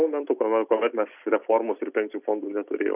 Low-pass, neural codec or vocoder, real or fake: 3.6 kHz; none; real